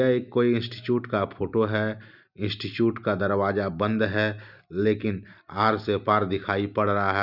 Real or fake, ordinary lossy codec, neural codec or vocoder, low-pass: real; none; none; 5.4 kHz